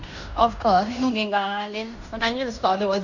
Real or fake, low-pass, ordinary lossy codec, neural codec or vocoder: fake; 7.2 kHz; none; codec, 16 kHz in and 24 kHz out, 0.9 kbps, LongCat-Audio-Codec, fine tuned four codebook decoder